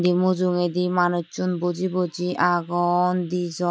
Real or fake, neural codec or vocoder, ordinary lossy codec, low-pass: real; none; none; none